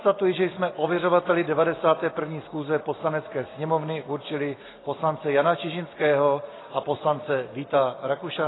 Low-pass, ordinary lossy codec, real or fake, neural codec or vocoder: 7.2 kHz; AAC, 16 kbps; real; none